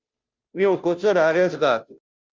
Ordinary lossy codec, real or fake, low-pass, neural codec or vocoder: Opus, 32 kbps; fake; 7.2 kHz; codec, 16 kHz, 0.5 kbps, FunCodec, trained on Chinese and English, 25 frames a second